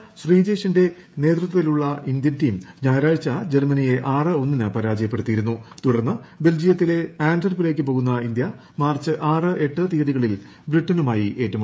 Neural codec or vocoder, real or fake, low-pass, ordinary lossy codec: codec, 16 kHz, 16 kbps, FreqCodec, smaller model; fake; none; none